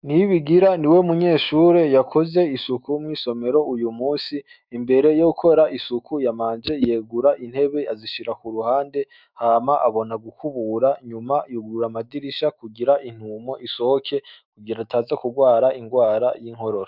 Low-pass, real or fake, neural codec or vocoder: 5.4 kHz; fake; autoencoder, 48 kHz, 128 numbers a frame, DAC-VAE, trained on Japanese speech